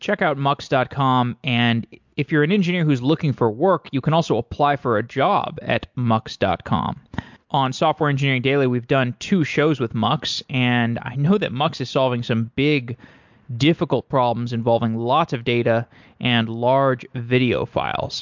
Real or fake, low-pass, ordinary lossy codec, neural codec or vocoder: real; 7.2 kHz; MP3, 64 kbps; none